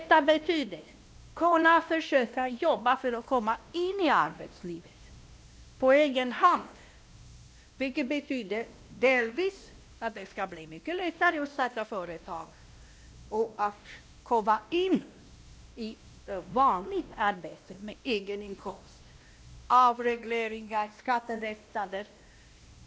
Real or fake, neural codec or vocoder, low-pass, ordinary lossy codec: fake; codec, 16 kHz, 1 kbps, X-Codec, WavLM features, trained on Multilingual LibriSpeech; none; none